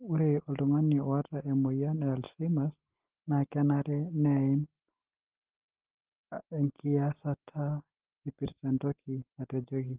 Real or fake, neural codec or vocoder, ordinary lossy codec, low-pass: real; none; Opus, 16 kbps; 3.6 kHz